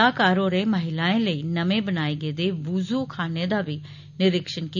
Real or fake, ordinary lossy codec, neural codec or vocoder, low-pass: real; none; none; 7.2 kHz